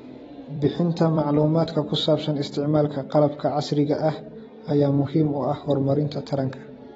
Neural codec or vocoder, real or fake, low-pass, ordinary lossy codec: vocoder, 44.1 kHz, 128 mel bands every 256 samples, BigVGAN v2; fake; 19.8 kHz; AAC, 24 kbps